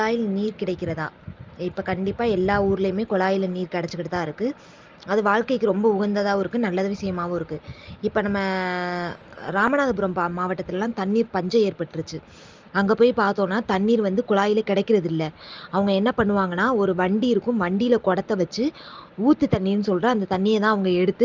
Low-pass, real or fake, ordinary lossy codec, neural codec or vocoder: 7.2 kHz; real; Opus, 24 kbps; none